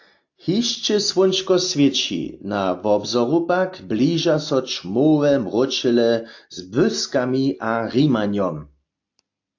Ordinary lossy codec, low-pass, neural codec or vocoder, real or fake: AAC, 48 kbps; 7.2 kHz; none; real